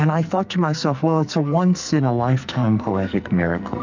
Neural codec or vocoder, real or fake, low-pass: codec, 44.1 kHz, 2.6 kbps, SNAC; fake; 7.2 kHz